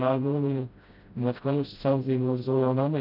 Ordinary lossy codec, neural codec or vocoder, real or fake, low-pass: none; codec, 16 kHz, 0.5 kbps, FreqCodec, smaller model; fake; 5.4 kHz